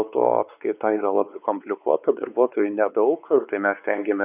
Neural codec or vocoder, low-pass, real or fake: codec, 16 kHz, 4 kbps, X-Codec, WavLM features, trained on Multilingual LibriSpeech; 3.6 kHz; fake